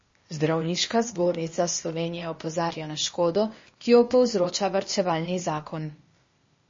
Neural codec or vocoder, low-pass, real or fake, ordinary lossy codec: codec, 16 kHz, 0.8 kbps, ZipCodec; 7.2 kHz; fake; MP3, 32 kbps